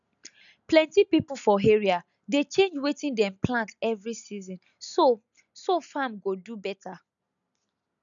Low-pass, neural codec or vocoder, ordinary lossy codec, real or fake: 7.2 kHz; none; none; real